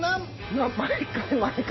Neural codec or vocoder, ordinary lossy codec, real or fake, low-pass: none; MP3, 24 kbps; real; 7.2 kHz